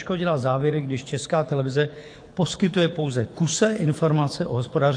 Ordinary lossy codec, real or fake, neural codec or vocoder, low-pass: AAC, 48 kbps; fake; codec, 24 kHz, 6 kbps, HILCodec; 9.9 kHz